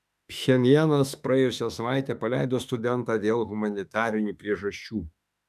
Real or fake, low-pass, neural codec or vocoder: fake; 14.4 kHz; autoencoder, 48 kHz, 32 numbers a frame, DAC-VAE, trained on Japanese speech